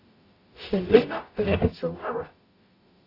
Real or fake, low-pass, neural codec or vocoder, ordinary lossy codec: fake; 5.4 kHz; codec, 44.1 kHz, 0.9 kbps, DAC; AAC, 32 kbps